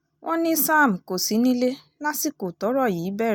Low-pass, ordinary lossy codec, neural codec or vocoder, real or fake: none; none; none; real